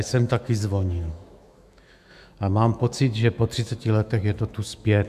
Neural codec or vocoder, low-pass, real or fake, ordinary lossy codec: autoencoder, 48 kHz, 128 numbers a frame, DAC-VAE, trained on Japanese speech; 14.4 kHz; fake; MP3, 96 kbps